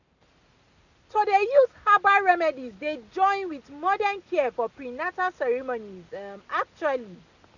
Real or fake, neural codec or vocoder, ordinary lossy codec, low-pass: real; none; none; 7.2 kHz